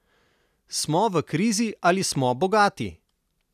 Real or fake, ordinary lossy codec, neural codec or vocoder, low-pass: real; none; none; 14.4 kHz